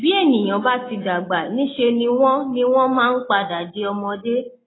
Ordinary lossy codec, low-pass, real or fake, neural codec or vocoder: AAC, 16 kbps; 7.2 kHz; real; none